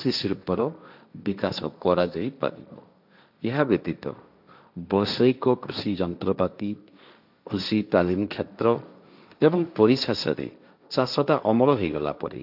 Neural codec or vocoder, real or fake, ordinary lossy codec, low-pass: codec, 16 kHz, 1.1 kbps, Voila-Tokenizer; fake; none; 5.4 kHz